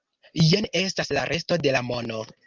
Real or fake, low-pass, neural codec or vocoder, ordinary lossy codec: real; 7.2 kHz; none; Opus, 24 kbps